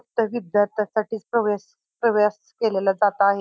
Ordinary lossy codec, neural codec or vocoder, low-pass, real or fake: none; none; none; real